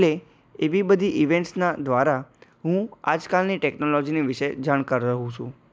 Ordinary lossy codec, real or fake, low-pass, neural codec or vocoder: none; real; none; none